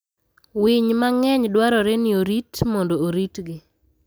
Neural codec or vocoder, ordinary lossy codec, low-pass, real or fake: none; none; none; real